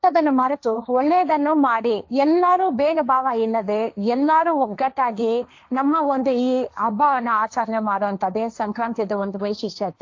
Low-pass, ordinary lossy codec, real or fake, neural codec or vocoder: 7.2 kHz; AAC, 48 kbps; fake; codec, 16 kHz, 1.1 kbps, Voila-Tokenizer